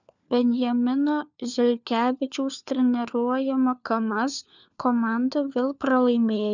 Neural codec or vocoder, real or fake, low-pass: codec, 16 kHz, 4 kbps, FunCodec, trained on LibriTTS, 50 frames a second; fake; 7.2 kHz